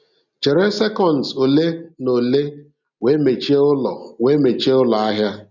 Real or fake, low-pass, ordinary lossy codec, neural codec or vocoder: real; 7.2 kHz; none; none